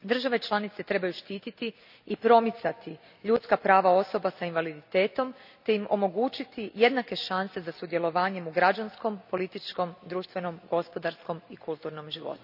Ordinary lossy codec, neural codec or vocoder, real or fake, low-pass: none; none; real; 5.4 kHz